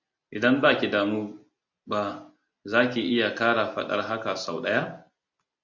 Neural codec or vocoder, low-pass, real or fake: none; 7.2 kHz; real